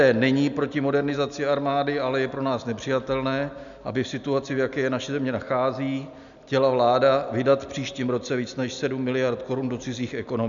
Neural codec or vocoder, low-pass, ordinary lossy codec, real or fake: none; 7.2 kHz; MP3, 96 kbps; real